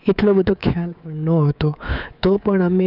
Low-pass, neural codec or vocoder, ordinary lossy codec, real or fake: 5.4 kHz; codec, 24 kHz, 3.1 kbps, DualCodec; none; fake